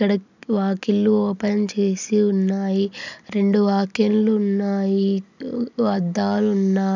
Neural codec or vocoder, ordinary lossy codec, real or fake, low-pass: none; none; real; 7.2 kHz